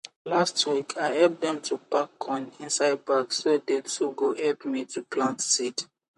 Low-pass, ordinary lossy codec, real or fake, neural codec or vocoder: 14.4 kHz; MP3, 48 kbps; fake; vocoder, 44.1 kHz, 128 mel bands, Pupu-Vocoder